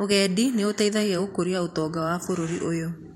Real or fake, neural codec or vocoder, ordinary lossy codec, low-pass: fake; vocoder, 44.1 kHz, 128 mel bands every 512 samples, BigVGAN v2; MP3, 64 kbps; 19.8 kHz